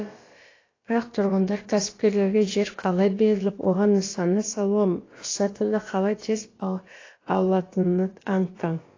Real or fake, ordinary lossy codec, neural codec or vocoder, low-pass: fake; AAC, 32 kbps; codec, 16 kHz, about 1 kbps, DyCAST, with the encoder's durations; 7.2 kHz